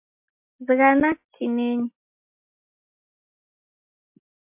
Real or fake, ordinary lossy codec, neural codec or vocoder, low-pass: real; MP3, 32 kbps; none; 3.6 kHz